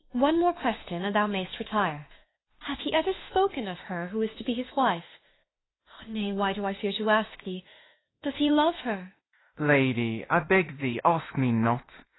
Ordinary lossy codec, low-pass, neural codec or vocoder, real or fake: AAC, 16 kbps; 7.2 kHz; autoencoder, 48 kHz, 32 numbers a frame, DAC-VAE, trained on Japanese speech; fake